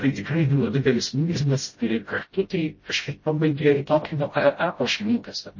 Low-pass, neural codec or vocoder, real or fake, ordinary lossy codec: 7.2 kHz; codec, 16 kHz, 0.5 kbps, FreqCodec, smaller model; fake; MP3, 32 kbps